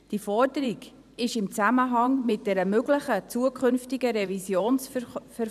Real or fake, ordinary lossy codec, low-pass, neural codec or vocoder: fake; none; 14.4 kHz; vocoder, 44.1 kHz, 128 mel bands every 512 samples, BigVGAN v2